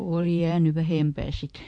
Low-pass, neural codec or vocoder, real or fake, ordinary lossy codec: 9.9 kHz; vocoder, 44.1 kHz, 128 mel bands every 512 samples, BigVGAN v2; fake; MP3, 48 kbps